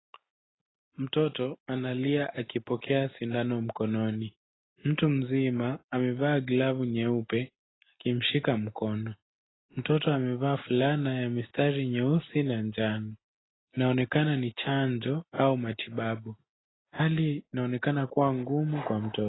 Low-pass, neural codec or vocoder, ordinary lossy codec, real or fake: 7.2 kHz; none; AAC, 16 kbps; real